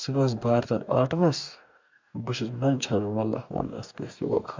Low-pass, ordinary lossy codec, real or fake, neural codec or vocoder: 7.2 kHz; MP3, 64 kbps; fake; codec, 44.1 kHz, 2.6 kbps, DAC